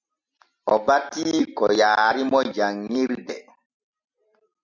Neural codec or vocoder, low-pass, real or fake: none; 7.2 kHz; real